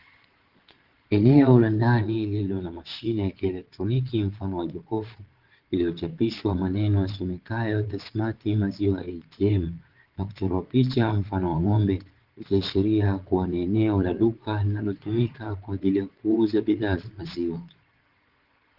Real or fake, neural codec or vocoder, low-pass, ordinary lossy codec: fake; vocoder, 22.05 kHz, 80 mel bands, Vocos; 5.4 kHz; Opus, 16 kbps